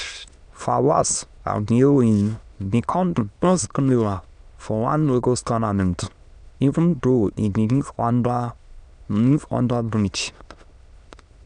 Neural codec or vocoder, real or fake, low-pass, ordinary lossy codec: autoencoder, 22.05 kHz, a latent of 192 numbers a frame, VITS, trained on many speakers; fake; 9.9 kHz; none